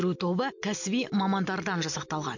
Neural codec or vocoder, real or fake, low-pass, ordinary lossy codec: none; real; 7.2 kHz; none